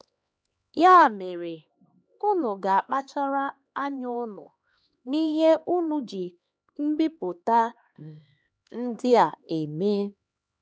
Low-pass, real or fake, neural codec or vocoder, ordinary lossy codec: none; fake; codec, 16 kHz, 2 kbps, X-Codec, HuBERT features, trained on LibriSpeech; none